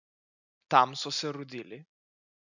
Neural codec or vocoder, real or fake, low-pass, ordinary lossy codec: none; real; 7.2 kHz; none